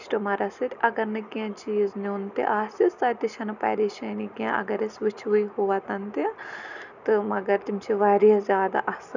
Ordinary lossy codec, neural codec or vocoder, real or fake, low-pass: none; none; real; 7.2 kHz